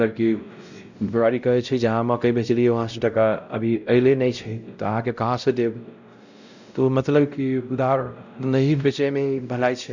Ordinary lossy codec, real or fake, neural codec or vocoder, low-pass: none; fake; codec, 16 kHz, 0.5 kbps, X-Codec, WavLM features, trained on Multilingual LibriSpeech; 7.2 kHz